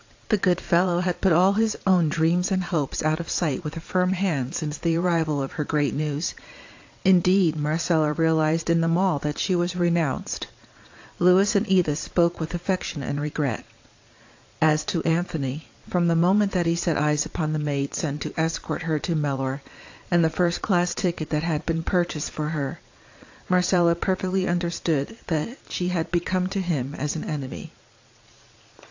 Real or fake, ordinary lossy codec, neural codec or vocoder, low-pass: fake; AAC, 48 kbps; vocoder, 22.05 kHz, 80 mel bands, Vocos; 7.2 kHz